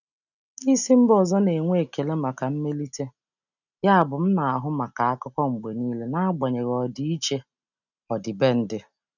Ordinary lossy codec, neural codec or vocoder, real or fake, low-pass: none; none; real; 7.2 kHz